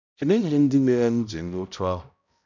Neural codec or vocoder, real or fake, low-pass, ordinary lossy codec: codec, 16 kHz, 0.5 kbps, X-Codec, HuBERT features, trained on balanced general audio; fake; 7.2 kHz; none